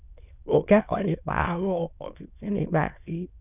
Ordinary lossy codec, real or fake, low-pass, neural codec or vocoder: none; fake; 3.6 kHz; autoencoder, 22.05 kHz, a latent of 192 numbers a frame, VITS, trained on many speakers